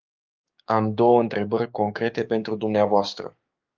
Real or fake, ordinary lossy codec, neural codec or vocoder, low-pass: fake; Opus, 24 kbps; codec, 16 kHz, 6 kbps, DAC; 7.2 kHz